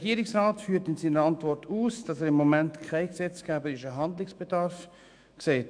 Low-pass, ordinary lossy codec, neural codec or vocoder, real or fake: 9.9 kHz; MP3, 96 kbps; autoencoder, 48 kHz, 128 numbers a frame, DAC-VAE, trained on Japanese speech; fake